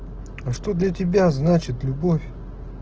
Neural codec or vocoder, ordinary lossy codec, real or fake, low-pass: none; Opus, 16 kbps; real; 7.2 kHz